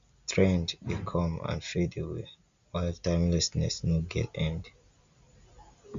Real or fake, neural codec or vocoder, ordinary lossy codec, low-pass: real; none; Opus, 64 kbps; 7.2 kHz